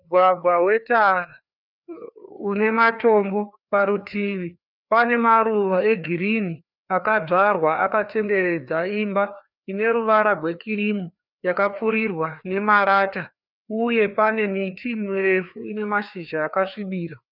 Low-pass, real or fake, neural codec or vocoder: 5.4 kHz; fake; codec, 16 kHz, 2 kbps, FreqCodec, larger model